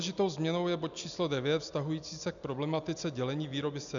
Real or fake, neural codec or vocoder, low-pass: real; none; 7.2 kHz